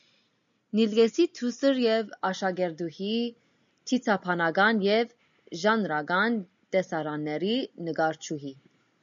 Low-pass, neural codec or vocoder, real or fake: 7.2 kHz; none; real